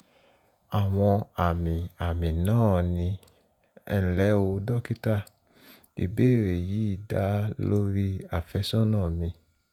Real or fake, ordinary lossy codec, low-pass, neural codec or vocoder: fake; none; 19.8 kHz; vocoder, 48 kHz, 128 mel bands, Vocos